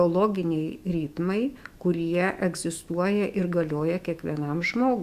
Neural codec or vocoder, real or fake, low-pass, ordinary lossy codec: autoencoder, 48 kHz, 128 numbers a frame, DAC-VAE, trained on Japanese speech; fake; 14.4 kHz; Opus, 64 kbps